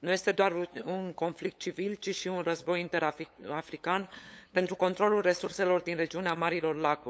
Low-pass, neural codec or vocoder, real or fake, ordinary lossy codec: none; codec, 16 kHz, 8 kbps, FunCodec, trained on LibriTTS, 25 frames a second; fake; none